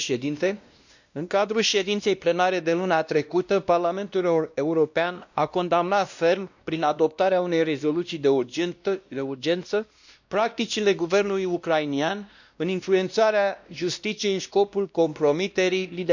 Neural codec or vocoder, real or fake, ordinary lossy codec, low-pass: codec, 16 kHz, 1 kbps, X-Codec, WavLM features, trained on Multilingual LibriSpeech; fake; none; 7.2 kHz